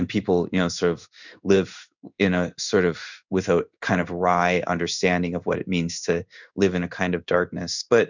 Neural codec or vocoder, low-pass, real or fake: codec, 16 kHz in and 24 kHz out, 1 kbps, XY-Tokenizer; 7.2 kHz; fake